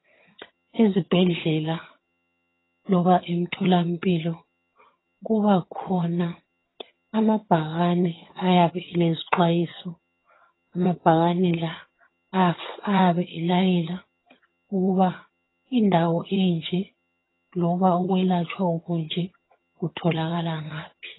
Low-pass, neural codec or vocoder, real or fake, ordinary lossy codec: 7.2 kHz; vocoder, 22.05 kHz, 80 mel bands, HiFi-GAN; fake; AAC, 16 kbps